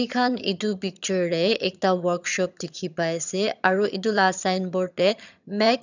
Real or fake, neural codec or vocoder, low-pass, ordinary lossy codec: fake; vocoder, 22.05 kHz, 80 mel bands, HiFi-GAN; 7.2 kHz; none